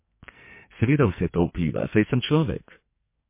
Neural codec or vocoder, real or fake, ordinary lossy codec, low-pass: codec, 44.1 kHz, 2.6 kbps, SNAC; fake; MP3, 24 kbps; 3.6 kHz